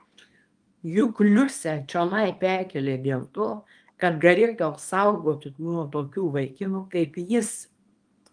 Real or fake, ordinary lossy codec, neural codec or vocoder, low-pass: fake; Opus, 32 kbps; codec, 24 kHz, 0.9 kbps, WavTokenizer, small release; 9.9 kHz